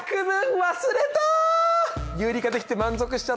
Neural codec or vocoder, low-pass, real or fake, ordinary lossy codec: none; none; real; none